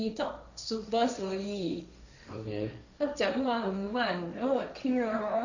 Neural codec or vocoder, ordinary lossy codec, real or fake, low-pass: codec, 16 kHz, 1.1 kbps, Voila-Tokenizer; none; fake; 7.2 kHz